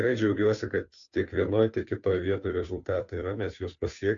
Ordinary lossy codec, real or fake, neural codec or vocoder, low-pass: AAC, 64 kbps; fake; codec, 16 kHz, 2 kbps, FunCodec, trained on Chinese and English, 25 frames a second; 7.2 kHz